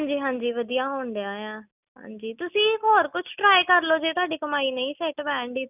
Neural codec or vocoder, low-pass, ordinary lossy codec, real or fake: none; 3.6 kHz; none; real